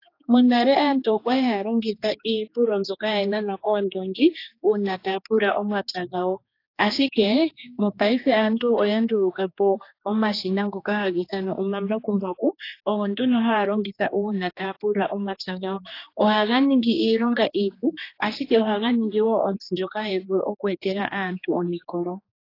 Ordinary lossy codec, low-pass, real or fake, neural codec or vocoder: AAC, 32 kbps; 5.4 kHz; fake; codec, 16 kHz, 2 kbps, X-Codec, HuBERT features, trained on general audio